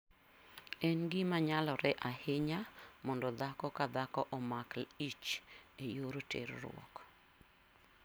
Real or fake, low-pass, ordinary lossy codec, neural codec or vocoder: real; none; none; none